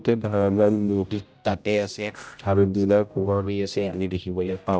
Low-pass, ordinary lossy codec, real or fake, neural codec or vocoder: none; none; fake; codec, 16 kHz, 0.5 kbps, X-Codec, HuBERT features, trained on general audio